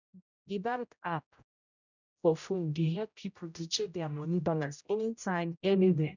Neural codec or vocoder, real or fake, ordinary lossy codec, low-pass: codec, 16 kHz, 0.5 kbps, X-Codec, HuBERT features, trained on general audio; fake; none; 7.2 kHz